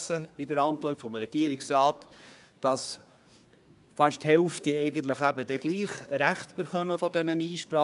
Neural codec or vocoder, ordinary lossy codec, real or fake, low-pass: codec, 24 kHz, 1 kbps, SNAC; none; fake; 10.8 kHz